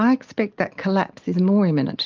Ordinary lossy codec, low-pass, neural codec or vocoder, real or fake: Opus, 32 kbps; 7.2 kHz; none; real